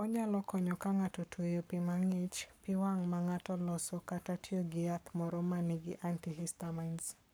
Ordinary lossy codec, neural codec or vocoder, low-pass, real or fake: none; codec, 44.1 kHz, 7.8 kbps, Pupu-Codec; none; fake